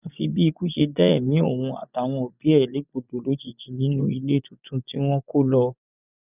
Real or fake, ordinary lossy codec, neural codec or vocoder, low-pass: fake; none; vocoder, 24 kHz, 100 mel bands, Vocos; 3.6 kHz